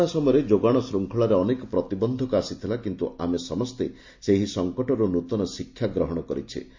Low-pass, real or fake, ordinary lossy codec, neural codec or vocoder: 7.2 kHz; real; MP3, 48 kbps; none